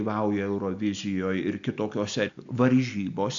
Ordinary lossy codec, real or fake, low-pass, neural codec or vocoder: AAC, 64 kbps; real; 7.2 kHz; none